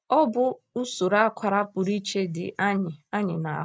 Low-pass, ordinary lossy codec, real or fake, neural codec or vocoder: none; none; real; none